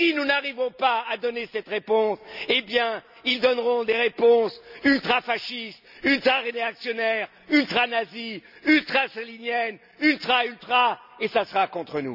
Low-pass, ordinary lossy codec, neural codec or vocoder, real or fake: 5.4 kHz; none; none; real